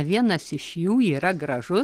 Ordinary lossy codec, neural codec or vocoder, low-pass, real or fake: Opus, 16 kbps; none; 14.4 kHz; real